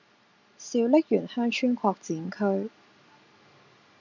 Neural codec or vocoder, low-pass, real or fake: none; 7.2 kHz; real